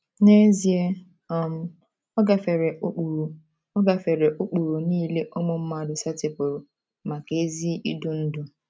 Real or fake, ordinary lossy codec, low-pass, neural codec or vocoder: real; none; none; none